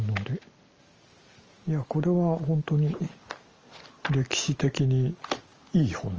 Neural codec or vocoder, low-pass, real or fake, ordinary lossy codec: none; 7.2 kHz; real; Opus, 24 kbps